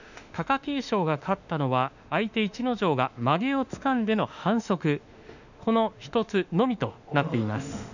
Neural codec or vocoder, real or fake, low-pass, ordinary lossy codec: autoencoder, 48 kHz, 32 numbers a frame, DAC-VAE, trained on Japanese speech; fake; 7.2 kHz; none